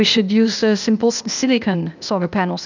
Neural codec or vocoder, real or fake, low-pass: codec, 16 kHz, 0.8 kbps, ZipCodec; fake; 7.2 kHz